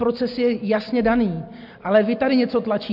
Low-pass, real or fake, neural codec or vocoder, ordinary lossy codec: 5.4 kHz; real; none; MP3, 48 kbps